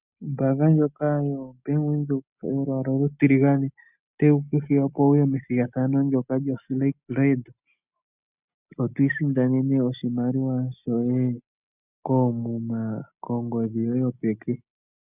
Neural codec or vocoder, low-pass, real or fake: none; 3.6 kHz; real